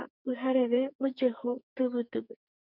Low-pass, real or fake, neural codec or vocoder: 5.4 kHz; fake; codec, 44.1 kHz, 2.6 kbps, SNAC